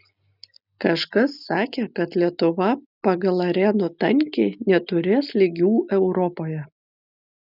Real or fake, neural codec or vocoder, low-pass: real; none; 5.4 kHz